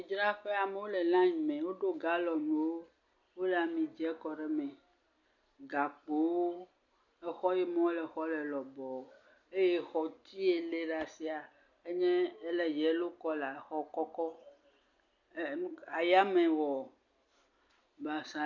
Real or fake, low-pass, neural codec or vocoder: real; 7.2 kHz; none